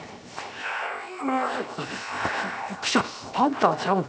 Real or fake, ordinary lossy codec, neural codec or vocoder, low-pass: fake; none; codec, 16 kHz, 0.7 kbps, FocalCodec; none